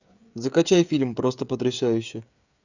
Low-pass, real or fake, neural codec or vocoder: 7.2 kHz; fake; codec, 16 kHz, 16 kbps, FreqCodec, smaller model